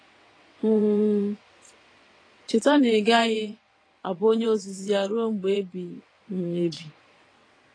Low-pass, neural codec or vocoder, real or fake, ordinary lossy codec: 9.9 kHz; vocoder, 22.05 kHz, 80 mel bands, WaveNeXt; fake; AAC, 32 kbps